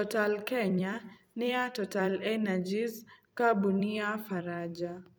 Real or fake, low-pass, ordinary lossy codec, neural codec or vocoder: fake; none; none; vocoder, 44.1 kHz, 128 mel bands every 256 samples, BigVGAN v2